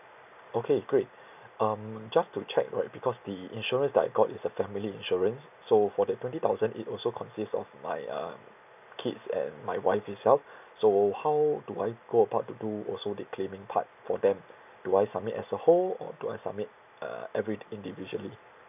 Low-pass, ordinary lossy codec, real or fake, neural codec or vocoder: 3.6 kHz; none; real; none